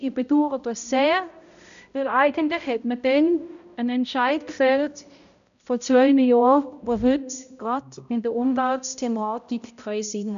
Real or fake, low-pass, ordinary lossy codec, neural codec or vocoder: fake; 7.2 kHz; none; codec, 16 kHz, 0.5 kbps, X-Codec, HuBERT features, trained on balanced general audio